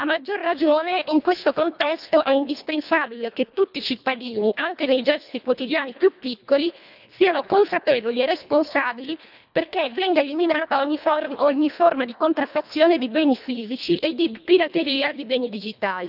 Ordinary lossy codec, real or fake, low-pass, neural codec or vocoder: none; fake; 5.4 kHz; codec, 24 kHz, 1.5 kbps, HILCodec